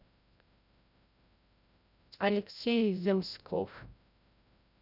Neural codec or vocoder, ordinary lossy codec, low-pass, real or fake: codec, 16 kHz, 0.5 kbps, FreqCodec, larger model; none; 5.4 kHz; fake